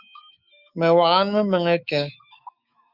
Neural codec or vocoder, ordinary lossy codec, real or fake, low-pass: autoencoder, 48 kHz, 128 numbers a frame, DAC-VAE, trained on Japanese speech; Opus, 64 kbps; fake; 5.4 kHz